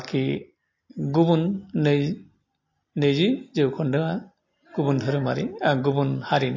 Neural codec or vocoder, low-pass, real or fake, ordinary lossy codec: none; 7.2 kHz; real; MP3, 32 kbps